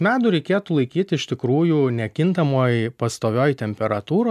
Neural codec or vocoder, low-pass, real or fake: none; 14.4 kHz; real